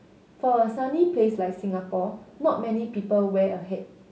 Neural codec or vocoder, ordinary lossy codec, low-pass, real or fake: none; none; none; real